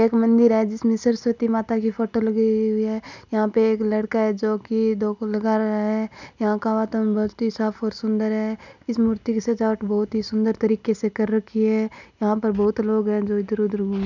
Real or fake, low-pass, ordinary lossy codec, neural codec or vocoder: real; 7.2 kHz; none; none